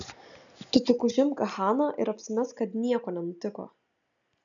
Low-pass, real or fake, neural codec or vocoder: 7.2 kHz; real; none